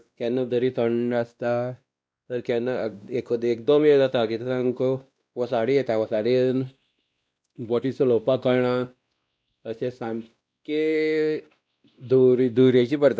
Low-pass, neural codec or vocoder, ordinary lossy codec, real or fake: none; codec, 16 kHz, 1 kbps, X-Codec, WavLM features, trained on Multilingual LibriSpeech; none; fake